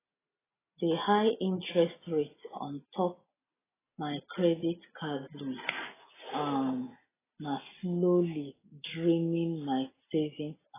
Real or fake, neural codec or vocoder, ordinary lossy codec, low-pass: real; none; AAC, 16 kbps; 3.6 kHz